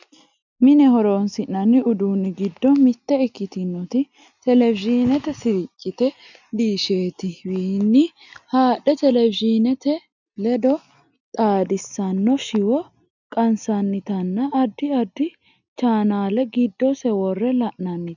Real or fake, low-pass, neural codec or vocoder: real; 7.2 kHz; none